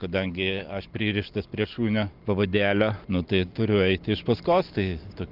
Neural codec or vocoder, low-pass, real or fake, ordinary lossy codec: none; 5.4 kHz; real; Opus, 24 kbps